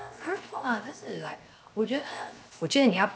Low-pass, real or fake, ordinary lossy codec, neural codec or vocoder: none; fake; none; codec, 16 kHz, 0.7 kbps, FocalCodec